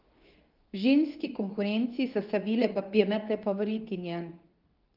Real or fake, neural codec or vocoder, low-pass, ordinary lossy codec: fake; codec, 24 kHz, 0.9 kbps, WavTokenizer, medium speech release version 2; 5.4 kHz; Opus, 24 kbps